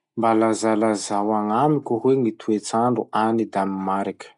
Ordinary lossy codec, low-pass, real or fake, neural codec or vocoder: none; 10.8 kHz; real; none